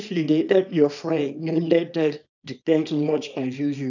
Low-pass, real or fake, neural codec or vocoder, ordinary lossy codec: 7.2 kHz; fake; codec, 24 kHz, 0.9 kbps, WavTokenizer, small release; none